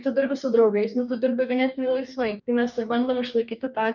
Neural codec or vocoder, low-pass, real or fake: codec, 44.1 kHz, 2.6 kbps, DAC; 7.2 kHz; fake